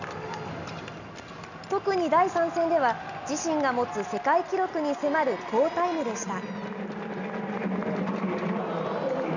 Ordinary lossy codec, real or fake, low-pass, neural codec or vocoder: none; real; 7.2 kHz; none